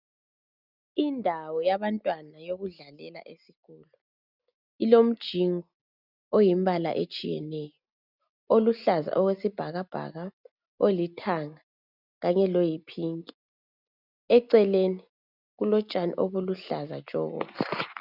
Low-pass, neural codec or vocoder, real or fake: 5.4 kHz; none; real